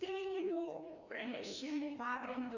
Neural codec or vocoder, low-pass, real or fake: codec, 16 kHz, 1 kbps, FreqCodec, larger model; 7.2 kHz; fake